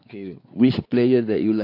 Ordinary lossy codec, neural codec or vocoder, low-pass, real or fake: AAC, 32 kbps; codec, 16 kHz, 4 kbps, X-Codec, WavLM features, trained on Multilingual LibriSpeech; 5.4 kHz; fake